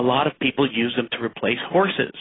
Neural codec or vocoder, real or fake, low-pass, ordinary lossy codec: none; real; 7.2 kHz; AAC, 16 kbps